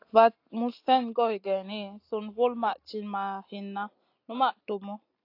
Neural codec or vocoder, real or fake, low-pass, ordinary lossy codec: none; real; 5.4 kHz; AAC, 32 kbps